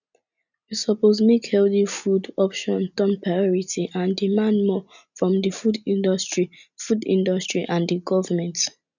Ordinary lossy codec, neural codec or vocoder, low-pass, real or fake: none; none; 7.2 kHz; real